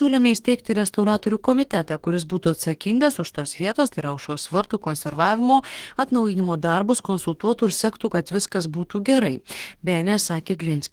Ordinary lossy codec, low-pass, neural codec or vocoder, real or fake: Opus, 24 kbps; 19.8 kHz; codec, 44.1 kHz, 2.6 kbps, DAC; fake